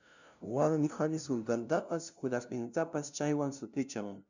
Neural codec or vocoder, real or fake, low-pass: codec, 16 kHz, 0.5 kbps, FunCodec, trained on LibriTTS, 25 frames a second; fake; 7.2 kHz